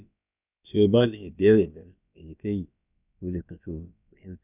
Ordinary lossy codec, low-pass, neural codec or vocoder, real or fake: none; 3.6 kHz; codec, 16 kHz, about 1 kbps, DyCAST, with the encoder's durations; fake